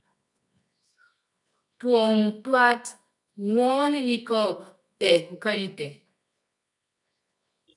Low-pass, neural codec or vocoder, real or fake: 10.8 kHz; codec, 24 kHz, 0.9 kbps, WavTokenizer, medium music audio release; fake